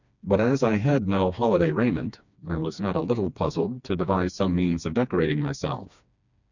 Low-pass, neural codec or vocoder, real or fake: 7.2 kHz; codec, 16 kHz, 2 kbps, FreqCodec, smaller model; fake